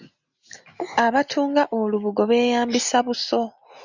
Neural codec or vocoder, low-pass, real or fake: none; 7.2 kHz; real